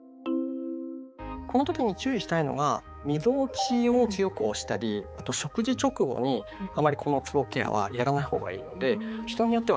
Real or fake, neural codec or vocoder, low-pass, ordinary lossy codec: fake; codec, 16 kHz, 4 kbps, X-Codec, HuBERT features, trained on balanced general audio; none; none